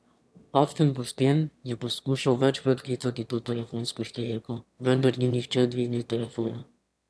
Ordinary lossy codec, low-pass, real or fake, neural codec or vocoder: none; none; fake; autoencoder, 22.05 kHz, a latent of 192 numbers a frame, VITS, trained on one speaker